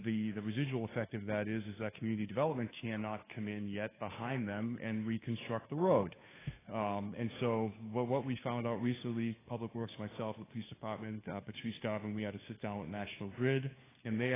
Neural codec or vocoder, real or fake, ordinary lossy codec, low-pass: codec, 16 kHz, 2 kbps, FunCodec, trained on Chinese and English, 25 frames a second; fake; AAC, 16 kbps; 3.6 kHz